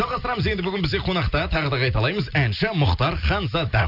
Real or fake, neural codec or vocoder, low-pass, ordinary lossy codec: real; none; 5.4 kHz; none